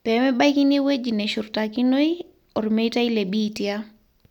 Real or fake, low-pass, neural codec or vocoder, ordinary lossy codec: real; 19.8 kHz; none; none